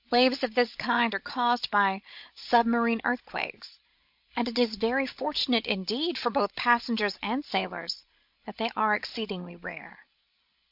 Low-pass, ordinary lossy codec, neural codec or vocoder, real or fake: 5.4 kHz; MP3, 48 kbps; codec, 16 kHz, 16 kbps, FreqCodec, larger model; fake